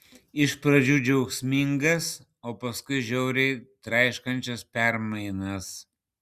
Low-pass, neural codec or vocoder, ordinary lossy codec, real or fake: 14.4 kHz; none; Opus, 64 kbps; real